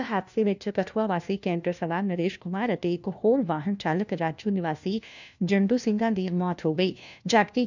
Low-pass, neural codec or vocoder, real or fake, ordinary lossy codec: 7.2 kHz; codec, 16 kHz, 1 kbps, FunCodec, trained on LibriTTS, 50 frames a second; fake; none